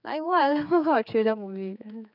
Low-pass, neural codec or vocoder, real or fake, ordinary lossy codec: 5.4 kHz; codec, 16 kHz, 4 kbps, X-Codec, HuBERT features, trained on general audio; fake; none